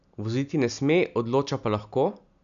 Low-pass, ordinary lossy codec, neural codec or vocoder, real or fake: 7.2 kHz; none; none; real